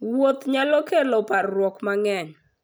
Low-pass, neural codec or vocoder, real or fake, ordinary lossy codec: none; none; real; none